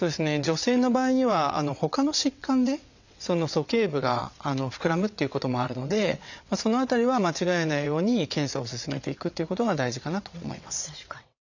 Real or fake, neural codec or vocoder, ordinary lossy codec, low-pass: fake; vocoder, 22.05 kHz, 80 mel bands, WaveNeXt; none; 7.2 kHz